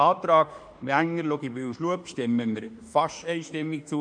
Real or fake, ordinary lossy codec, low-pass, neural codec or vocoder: fake; MP3, 96 kbps; 9.9 kHz; autoencoder, 48 kHz, 32 numbers a frame, DAC-VAE, trained on Japanese speech